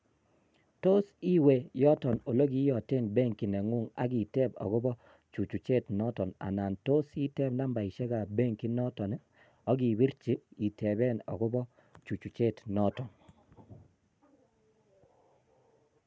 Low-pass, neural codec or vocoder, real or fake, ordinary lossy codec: none; none; real; none